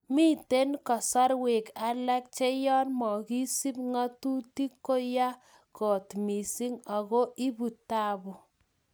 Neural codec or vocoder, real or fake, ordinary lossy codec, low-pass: none; real; none; none